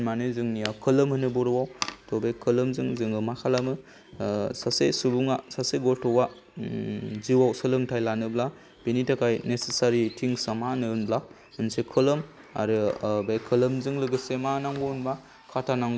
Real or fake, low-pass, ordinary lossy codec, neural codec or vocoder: real; none; none; none